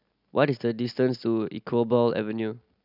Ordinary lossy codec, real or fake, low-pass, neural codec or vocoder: none; real; 5.4 kHz; none